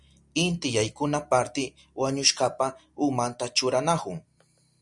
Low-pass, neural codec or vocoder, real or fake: 10.8 kHz; none; real